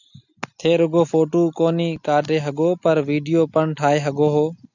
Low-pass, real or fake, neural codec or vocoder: 7.2 kHz; real; none